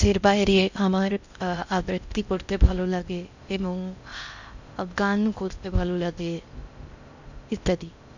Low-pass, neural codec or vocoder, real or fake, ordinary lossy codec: 7.2 kHz; codec, 16 kHz in and 24 kHz out, 0.6 kbps, FocalCodec, streaming, 4096 codes; fake; none